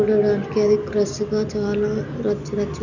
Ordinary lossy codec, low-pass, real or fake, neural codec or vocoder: none; 7.2 kHz; real; none